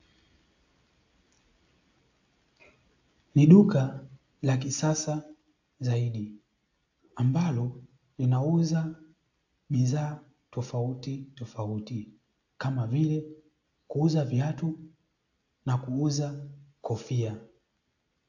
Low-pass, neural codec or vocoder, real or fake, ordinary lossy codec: 7.2 kHz; none; real; AAC, 48 kbps